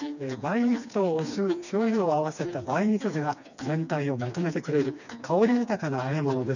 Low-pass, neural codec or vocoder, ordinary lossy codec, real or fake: 7.2 kHz; codec, 16 kHz, 2 kbps, FreqCodec, smaller model; none; fake